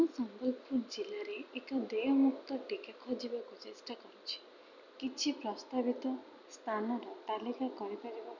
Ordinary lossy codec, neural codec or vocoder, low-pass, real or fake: none; none; 7.2 kHz; real